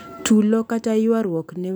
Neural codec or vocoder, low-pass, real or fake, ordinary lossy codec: none; none; real; none